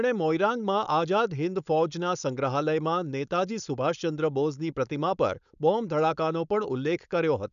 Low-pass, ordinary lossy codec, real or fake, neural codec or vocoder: 7.2 kHz; none; fake; codec, 16 kHz, 4.8 kbps, FACodec